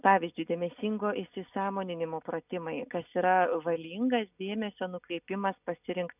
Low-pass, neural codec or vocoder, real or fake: 3.6 kHz; none; real